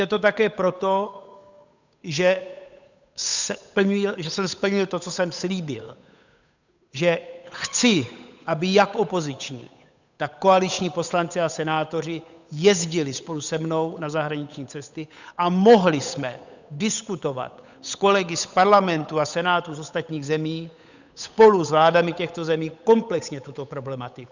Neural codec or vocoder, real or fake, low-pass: codec, 16 kHz, 8 kbps, FunCodec, trained on Chinese and English, 25 frames a second; fake; 7.2 kHz